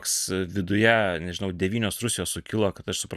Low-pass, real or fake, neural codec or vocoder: 14.4 kHz; real; none